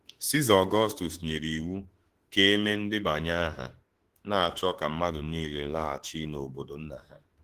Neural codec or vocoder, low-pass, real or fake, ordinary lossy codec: autoencoder, 48 kHz, 32 numbers a frame, DAC-VAE, trained on Japanese speech; 14.4 kHz; fake; Opus, 16 kbps